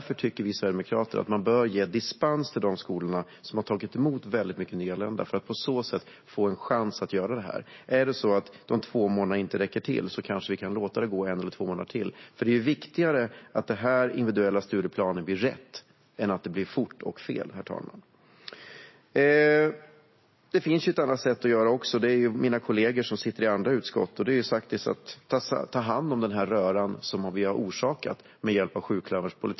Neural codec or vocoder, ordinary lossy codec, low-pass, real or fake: none; MP3, 24 kbps; 7.2 kHz; real